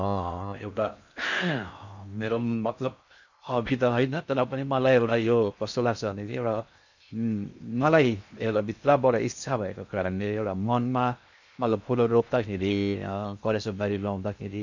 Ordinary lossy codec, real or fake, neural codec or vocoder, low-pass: none; fake; codec, 16 kHz in and 24 kHz out, 0.6 kbps, FocalCodec, streaming, 4096 codes; 7.2 kHz